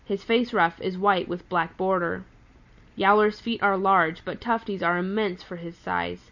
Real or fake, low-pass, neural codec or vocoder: real; 7.2 kHz; none